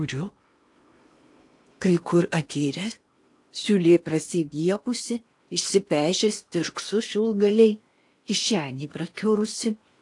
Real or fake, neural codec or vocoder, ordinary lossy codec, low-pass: fake; codec, 16 kHz in and 24 kHz out, 0.8 kbps, FocalCodec, streaming, 65536 codes; AAC, 64 kbps; 10.8 kHz